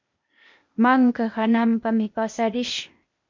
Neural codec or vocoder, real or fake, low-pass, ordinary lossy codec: codec, 16 kHz, 0.8 kbps, ZipCodec; fake; 7.2 kHz; MP3, 48 kbps